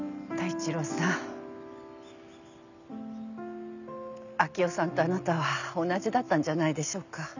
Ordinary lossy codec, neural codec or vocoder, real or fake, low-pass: none; none; real; 7.2 kHz